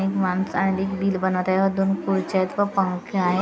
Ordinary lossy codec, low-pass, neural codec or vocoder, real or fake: none; none; none; real